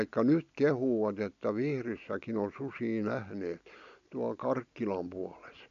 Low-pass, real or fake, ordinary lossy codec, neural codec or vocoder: 7.2 kHz; real; MP3, 64 kbps; none